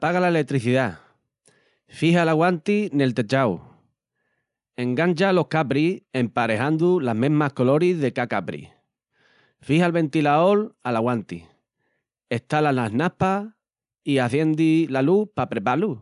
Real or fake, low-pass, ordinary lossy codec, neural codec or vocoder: real; 10.8 kHz; none; none